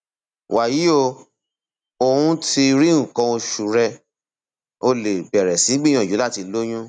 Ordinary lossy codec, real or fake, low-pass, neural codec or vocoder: none; real; 9.9 kHz; none